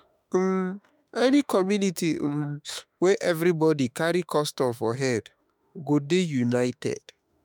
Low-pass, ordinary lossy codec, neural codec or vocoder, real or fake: none; none; autoencoder, 48 kHz, 32 numbers a frame, DAC-VAE, trained on Japanese speech; fake